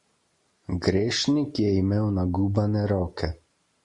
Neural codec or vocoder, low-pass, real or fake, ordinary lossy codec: none; 10.8 kHz; real; MP3, 48 kbps